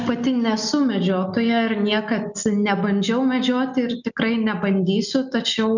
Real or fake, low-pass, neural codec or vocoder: real; 7.2 kHz; none